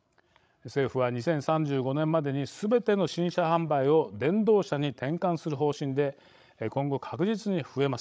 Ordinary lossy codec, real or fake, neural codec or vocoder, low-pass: none; fake; codec, 16 kHz, 16 kbps, FreqCodec, larger model; none